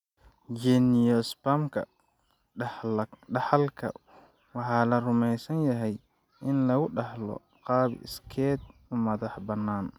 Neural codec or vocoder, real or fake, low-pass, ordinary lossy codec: none; real; 19.8 kHz; none